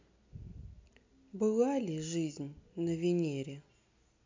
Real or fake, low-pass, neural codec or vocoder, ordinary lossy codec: real; 7.2 kHz; none; MP3, 64 kbps